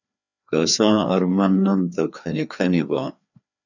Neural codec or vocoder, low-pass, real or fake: codec, 16 kHz, 2 kbps, FreqCodec, larger model; 7.2 kHz; fake